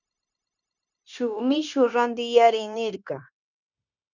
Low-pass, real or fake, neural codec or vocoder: 7.2 kHz; fake; codec, 16 kHz, 0.9 kbps, LongCat-Audio-Codec